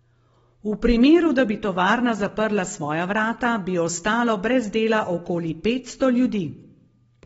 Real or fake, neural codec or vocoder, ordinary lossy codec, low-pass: real; none; AAC, 24 kbps; 19.8 kHz